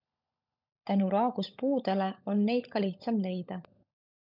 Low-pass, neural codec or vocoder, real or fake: 5.4 kHz; codec, 16 kHz, 16 kbps, FunCodec, trained on LibriTTS, 50 frames a second; fake